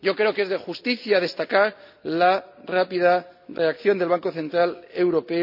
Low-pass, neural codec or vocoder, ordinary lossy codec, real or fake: 5.4 kHz; none; none; real